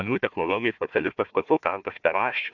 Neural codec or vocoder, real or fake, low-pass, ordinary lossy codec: codec, 16 kHz, 1 kbps, FunCodec, trained on Chinese and English, 50 frames a second; fake; 7.2 kHz; MP3, 64 kbps